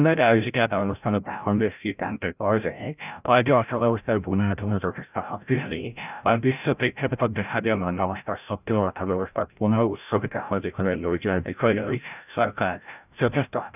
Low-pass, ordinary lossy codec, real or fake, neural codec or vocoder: 3.6 kHz; none; fake; codec, 16 kHz, 0.5 kbps, FreqCodec, larger model